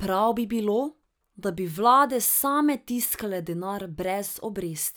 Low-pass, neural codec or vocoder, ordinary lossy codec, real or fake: none; none; none; real